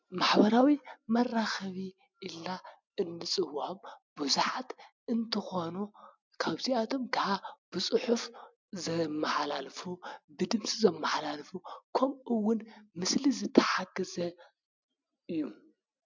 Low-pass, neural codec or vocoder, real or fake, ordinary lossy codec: 7.2 kHz; none; real; MP3, 64 kbps